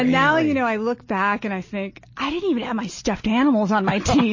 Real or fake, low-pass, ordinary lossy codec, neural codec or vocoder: real; 7.2 kHz; MP3, 32 kbps; none